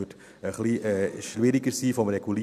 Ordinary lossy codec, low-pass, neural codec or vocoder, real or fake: none; 14.4 kHz; none; real